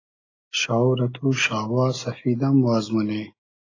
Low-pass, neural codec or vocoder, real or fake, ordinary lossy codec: 7.2 kHz; none; real; AAC, 32 kbps